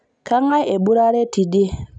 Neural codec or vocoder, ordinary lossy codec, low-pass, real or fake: none; none; 9.9 kHz; real